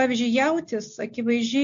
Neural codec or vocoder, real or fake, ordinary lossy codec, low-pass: none; real; AAC, 64 kbps; 7.2 kHz